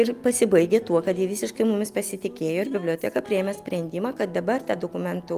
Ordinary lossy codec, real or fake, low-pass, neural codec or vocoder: Opus, 32 kbps; real; 14.4 kHz; none